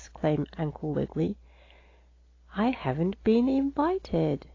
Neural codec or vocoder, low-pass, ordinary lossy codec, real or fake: none; 7.2 kHz; AAC, 32 kbps; real